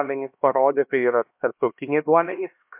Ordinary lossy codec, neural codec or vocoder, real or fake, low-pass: MP3, 32 kbps; codec, 16 kHz, 1 kbps, X-Codec, HuBERT features, trained on LibriSpeech; fake; 3.6 kHz